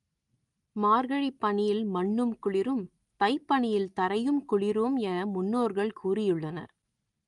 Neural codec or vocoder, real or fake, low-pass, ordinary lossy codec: none; real; 10.8 kHz; Opus, 32 kbps